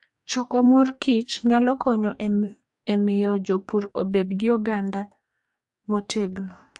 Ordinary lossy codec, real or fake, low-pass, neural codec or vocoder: AAC, 64 kbps; fake; 10.8 kHz; codec, 44.1 kHz, 2.6 kbps, DAC